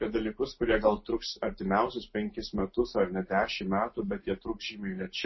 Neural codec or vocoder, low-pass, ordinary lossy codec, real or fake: none; 7.2 kHz; MP3, 24 kbps; real